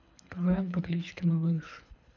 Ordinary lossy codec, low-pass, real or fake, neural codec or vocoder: none; 7.2 kHz; fake; codec, 24 kHz, 3 kbps, HILCodec